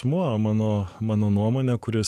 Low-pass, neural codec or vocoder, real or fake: 14.4 kHz; codec, 44.1 kHz, 7.8 kbps, DAC; fake